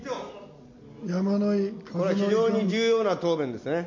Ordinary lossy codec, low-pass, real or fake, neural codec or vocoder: MP3, 48 kbps; 7.2 kHz; real; none